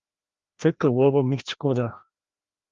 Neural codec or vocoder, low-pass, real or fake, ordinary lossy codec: codec, 16 kHz, 1 kbps, FreqCodec, larger model; 7.2 kHz; fake; Opus, 32 kbps